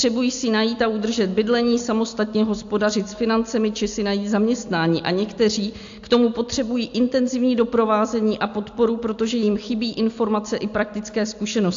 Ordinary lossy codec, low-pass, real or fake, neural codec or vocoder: AAC, 64 kbps; 7.2 kHz; real; none